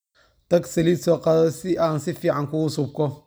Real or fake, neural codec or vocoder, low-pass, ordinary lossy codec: real; none; none; none